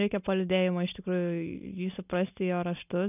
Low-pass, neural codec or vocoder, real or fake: 3.6 kHz; none; real